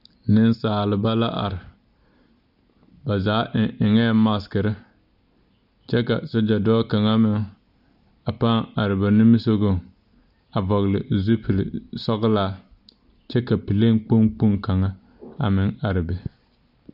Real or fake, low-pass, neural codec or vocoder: real; 5.4 kHz; none